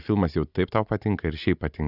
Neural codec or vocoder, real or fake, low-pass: none; real; 5.4 kHz